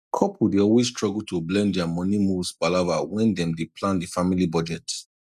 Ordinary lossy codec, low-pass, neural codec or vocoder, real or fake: none; 14.4 kHz; none; real